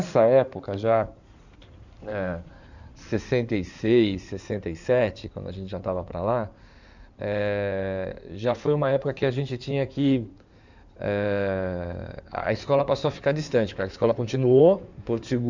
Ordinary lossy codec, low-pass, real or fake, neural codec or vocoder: none; 7.2 kHz; fake; codec, 16 kHz in and 24 kHz out, 2.2 kbps, FireRedTTS-2 codec